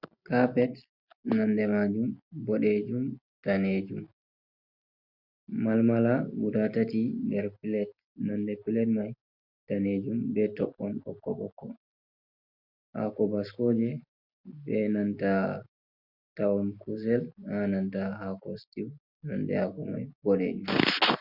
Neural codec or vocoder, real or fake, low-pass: none; real; 5.4 kHz